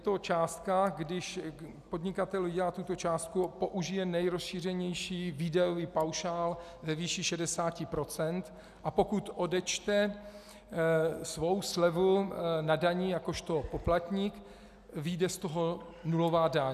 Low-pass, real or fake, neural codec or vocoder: 14.4 kHz; real; none